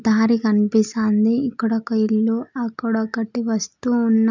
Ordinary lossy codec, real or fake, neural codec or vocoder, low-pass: none; real; none; 7.2 kHz